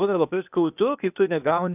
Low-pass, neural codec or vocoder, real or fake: 3.6 kHz; codec, 16 kHz, 0.8 kbps, ZipCodec; fake